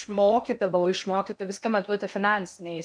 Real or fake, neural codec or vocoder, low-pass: fake; codec, 16 kHz in and 24 kHz out, 0.8 kbps, FocalCodec, streaming, 65536 codes; 9.9 kHz